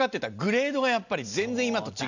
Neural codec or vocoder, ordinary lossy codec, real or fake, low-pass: none; none; real; 7.2 kHz